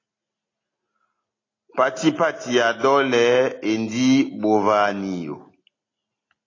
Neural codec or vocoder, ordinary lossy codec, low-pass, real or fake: none; AAC, 32 kbps; 7.2 kHz; real